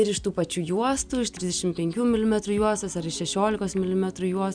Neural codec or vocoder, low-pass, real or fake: none; 9.9 kHz; real